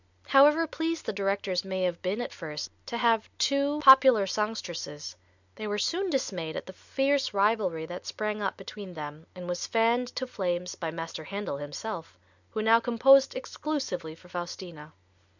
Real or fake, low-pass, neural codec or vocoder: real; 7.2 kHz; none